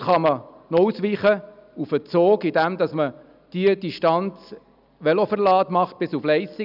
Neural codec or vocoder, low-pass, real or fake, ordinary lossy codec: none; 5.4 kHz; real; none